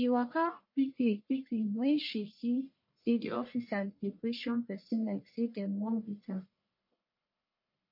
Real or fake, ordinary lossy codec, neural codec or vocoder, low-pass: fake; MP3, 32 kbps; codec, 44.1 kHz, 1.7 kbps, Pupu-Codec; 5.4 kHz